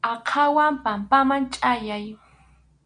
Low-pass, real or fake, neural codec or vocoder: 9.9 kHz; real; none